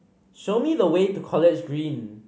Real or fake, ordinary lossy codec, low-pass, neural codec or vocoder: real; none; none; none